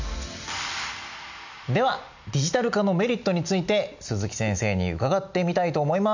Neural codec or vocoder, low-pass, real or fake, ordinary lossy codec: none; 7.2 kHz; real; none